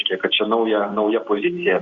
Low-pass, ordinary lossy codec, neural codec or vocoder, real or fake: 7.2 kHz; Opus, 64 kbps; none; real